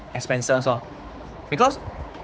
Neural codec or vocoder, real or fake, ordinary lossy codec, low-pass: codec, 16 kHz, 4 kbps, X-Codec, HuBERT features, trained on general audio; fake; none; none